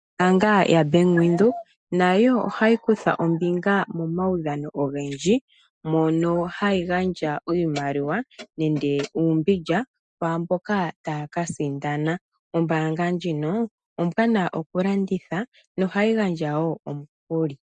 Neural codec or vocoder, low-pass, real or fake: none; 9.9 kHz; real